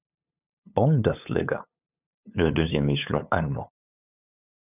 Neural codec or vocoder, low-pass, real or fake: codec, 16 kHz, 8 kbps, FunCodec, trained on LibriTTS, 25 frames a second; 3.6 kHz; fake